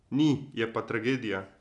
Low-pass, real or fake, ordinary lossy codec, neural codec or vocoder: 10.8 kHz; real; none; none